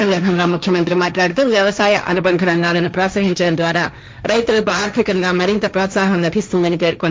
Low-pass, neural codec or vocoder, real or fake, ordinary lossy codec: none; codec, 16 kHz, 1.1 kbps, Voila-Tokenizer; fake; none